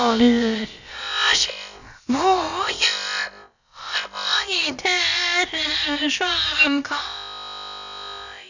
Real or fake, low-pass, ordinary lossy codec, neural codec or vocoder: fake; 7.2 kHz; none; codec, 16 kHz, about 1 kbps, DyCAST, with the encoder's durations